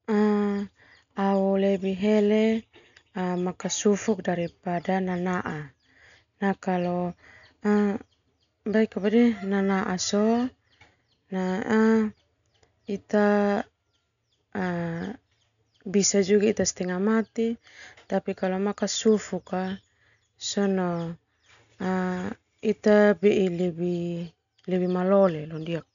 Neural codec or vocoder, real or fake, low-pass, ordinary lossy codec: none; real; 7.2 kHz; none